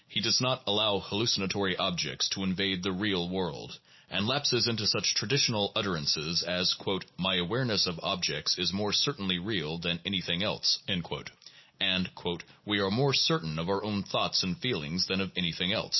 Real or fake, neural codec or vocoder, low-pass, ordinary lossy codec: real; none; 7.2 kHz; MP3, 24 kbps